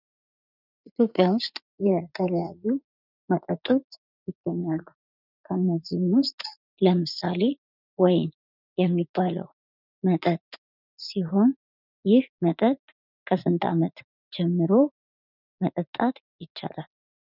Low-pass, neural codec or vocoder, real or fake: 5.4 kHz; vocoder, 44.1 kHz, 80 mel bands, Vocos; fake